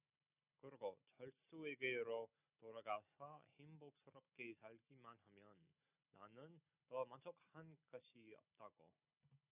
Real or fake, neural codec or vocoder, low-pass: real; none; 3.6 kHz